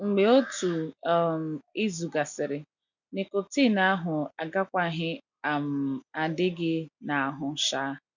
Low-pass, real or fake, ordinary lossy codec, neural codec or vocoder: 7.2 kHz; real; none; none